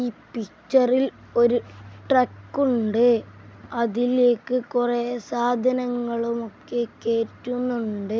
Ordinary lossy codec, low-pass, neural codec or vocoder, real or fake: none; none; none; real